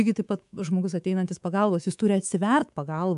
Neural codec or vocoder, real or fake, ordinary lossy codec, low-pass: codec, 24 kHz, 3.1 kbps, DualCodec; fake; AAC, 96 kbps; 10.8 kHz